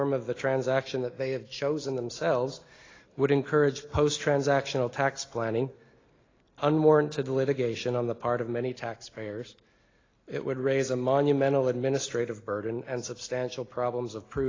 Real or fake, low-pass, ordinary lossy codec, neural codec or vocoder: real; 7.2 kHz; AAC, 32 kbps; none